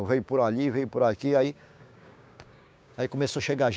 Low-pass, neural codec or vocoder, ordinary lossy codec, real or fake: none; codec, 16 kHz, 6 kbps, DAC; none; fake